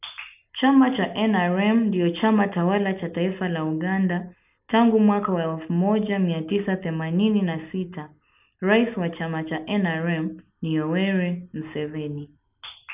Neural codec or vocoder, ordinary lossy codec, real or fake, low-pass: none; none; real; 3.6 kHz